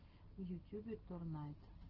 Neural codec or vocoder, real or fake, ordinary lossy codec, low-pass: none; real; Opus, 16 kbps; 5.4 kHz